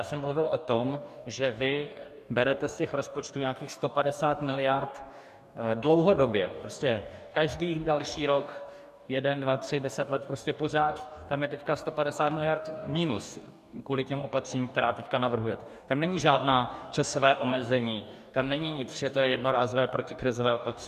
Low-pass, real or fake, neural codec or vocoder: 14.4 kHz; fake; codec, 44.1 kHz, 2.6 kbps, DAC